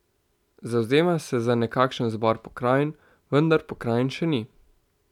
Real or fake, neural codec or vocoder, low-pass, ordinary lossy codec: real; none; 19.8 kHz; none